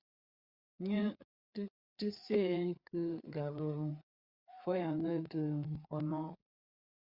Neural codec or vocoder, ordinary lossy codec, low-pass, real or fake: codec, 16 kHz, 4 kbps, FreqCodec, larger model; Opus, 64 kbps; 5.4 kHz; fake